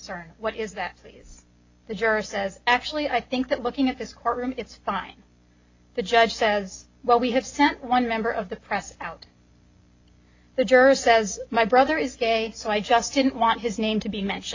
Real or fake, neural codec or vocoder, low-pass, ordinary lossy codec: real; none; 7.2 kHz; AAC, 48 kbps